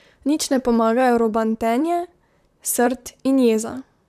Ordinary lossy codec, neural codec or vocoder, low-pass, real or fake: none; vocoder, 44.1 kHz, 128 mel bands, Pupu-Vocoder; 14.4 kHz; fake